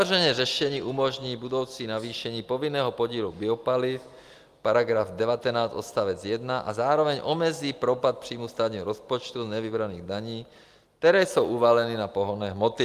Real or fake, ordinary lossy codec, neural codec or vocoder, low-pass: real; Opus, 32 kbps; none; 14.4 kHz